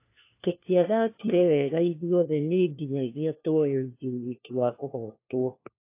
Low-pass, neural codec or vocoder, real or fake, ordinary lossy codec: 3.6 kHz; codec, 16 kHz, 1 kbps, FunCodec, trained on LibriTTS, 50 frames a second; fake; AAC, 24 kbps